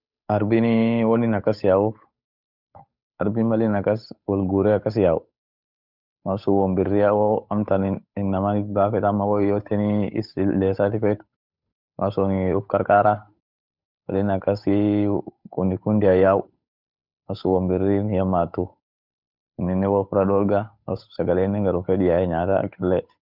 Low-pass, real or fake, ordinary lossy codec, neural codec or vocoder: 5.4 kHz; fake; none; codec, 16 kHz, 8 kbps, FunCodec, trained on Chinese and English, 25 frames a second